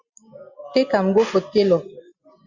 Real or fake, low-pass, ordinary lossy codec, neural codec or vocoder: real; 7.2 kHz; Opus, 64 kbps; none